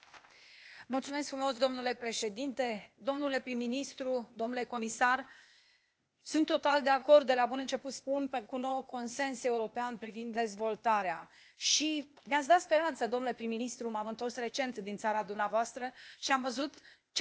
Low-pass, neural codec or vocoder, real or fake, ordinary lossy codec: none; codec, 16 kHz, 0.8 kbps, ZipCodec; fake; none